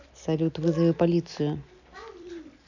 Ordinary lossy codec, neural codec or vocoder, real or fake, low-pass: none; none; real; 7.2 kHz